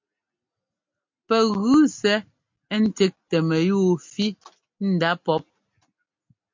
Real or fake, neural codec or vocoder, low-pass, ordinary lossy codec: real; none; 7.2 kHz; MP3, 48 kbps